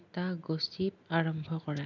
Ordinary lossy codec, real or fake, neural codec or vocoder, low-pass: none; real; none; 7.2 kHz